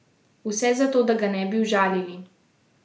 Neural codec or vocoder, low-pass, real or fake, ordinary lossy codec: none; none; real; none